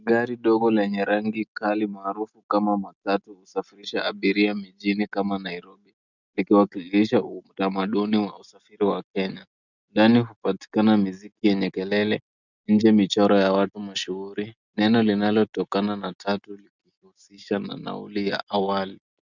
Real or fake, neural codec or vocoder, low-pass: real; none; 7.2 kHz